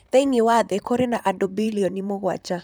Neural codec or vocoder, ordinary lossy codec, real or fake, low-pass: vocoder, 44.1 kHz, 128 mel bands, Pupu-Vocoder; none; fake; none